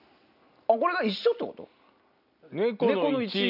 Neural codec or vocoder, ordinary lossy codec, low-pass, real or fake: none; none; 5.4 kHz; real